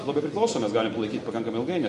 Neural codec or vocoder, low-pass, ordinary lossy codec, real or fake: none; 14.4 kHz; MP3, 48 kbps; real